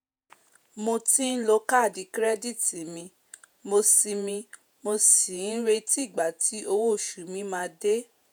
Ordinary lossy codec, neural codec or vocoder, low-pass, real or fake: none; vocoder, 48 kHz, 128 mel bands, Vocos; none; fake